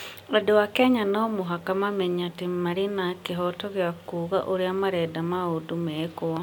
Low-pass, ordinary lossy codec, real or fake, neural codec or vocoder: 19.8 kHz; none; real; none